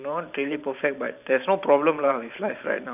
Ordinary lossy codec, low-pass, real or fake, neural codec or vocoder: none; 3.6 kHz; fake; vocoder, 44.1 kHz, 128 mel bands every 512 samples, BigVGAN v2